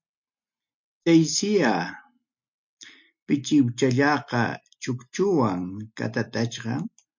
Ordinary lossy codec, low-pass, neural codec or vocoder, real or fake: MP3, 48 kbps; 7.2 kHz; none; real